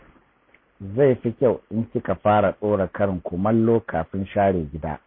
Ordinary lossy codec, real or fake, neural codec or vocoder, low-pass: MP3, 24 kbps; real; none; 5.4 kHz